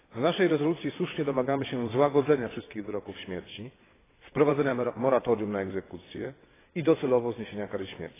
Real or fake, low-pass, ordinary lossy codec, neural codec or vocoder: fake; 3.6 kHz; AAC, 16 kbps; vocoder, 22.05 kHz, 80 mel bands, WaveNeXt